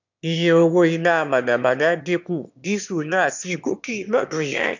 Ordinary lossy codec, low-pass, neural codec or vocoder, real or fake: none; 7.2 kHz; autoencoder, 22.05 kHz, a latent of 192 numbers a frame, VITS, trained on one speaker; fake